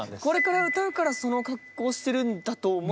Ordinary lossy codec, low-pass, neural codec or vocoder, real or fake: none; none; none; real